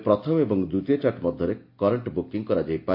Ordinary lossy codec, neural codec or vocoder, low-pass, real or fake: MP3, 32 kbps; none; 5.4 kHz; real